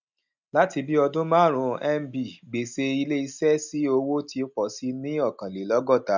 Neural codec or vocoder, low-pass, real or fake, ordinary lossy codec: none; 7.2 kHz; real; none